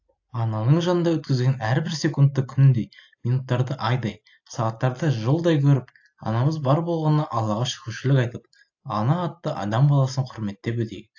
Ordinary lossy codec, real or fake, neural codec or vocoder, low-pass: AAC, 48 kbps; real; none; 7.2 kHz